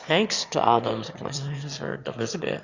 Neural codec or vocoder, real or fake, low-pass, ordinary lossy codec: autoencoder, 22.05 kHz, a latent of 192 numbers a frame, VITS, trained on one speaker; fake; 7.2 kHz; Opus, 64 kbps